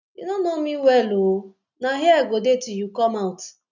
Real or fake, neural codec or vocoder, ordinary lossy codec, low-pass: real; none; none; 7.2 kHz